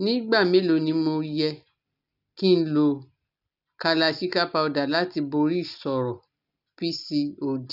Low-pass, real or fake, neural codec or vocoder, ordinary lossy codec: 5.4 kHz; real; none; none